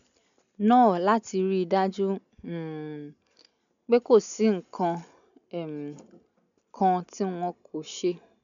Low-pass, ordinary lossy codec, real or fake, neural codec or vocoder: 7.2 kHz; none; real; none